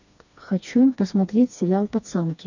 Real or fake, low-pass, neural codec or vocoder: fake; 7.2 kHz; codec, 16 kHz, 2 kbps, FreqCodec, smaller model